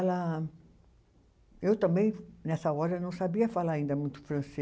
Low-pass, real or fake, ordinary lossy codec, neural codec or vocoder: none; real; none; none